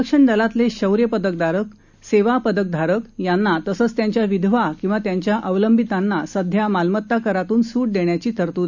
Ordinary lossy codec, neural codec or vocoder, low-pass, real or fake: none; none; 7.2 kHz; real